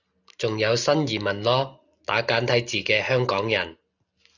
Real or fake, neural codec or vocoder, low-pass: real; none; 7.2 kHz